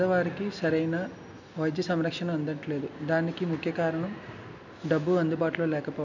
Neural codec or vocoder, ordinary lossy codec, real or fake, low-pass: none; none; real; 7.2 kHz